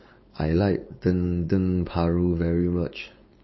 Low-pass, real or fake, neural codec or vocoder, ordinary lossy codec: 7.2 kHz; fake; codec, 16 kHz, 4.8 kbps, FACodec; MP3, 24 kbps